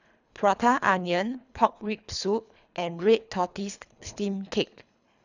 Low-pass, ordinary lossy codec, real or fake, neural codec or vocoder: 7.2 kHz; none; fake; codec, 24 kHz, 3 kbps, HILCodec